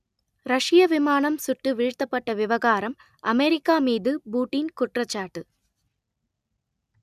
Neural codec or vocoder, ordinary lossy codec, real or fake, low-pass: none; none; real; 14.4 kHz